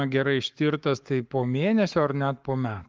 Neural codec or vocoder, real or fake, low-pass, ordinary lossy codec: codec, 44.1 kHz, 7.8 kbps, Pupu-Codec; fake; 7.2 kHz; Opus, 32 kbps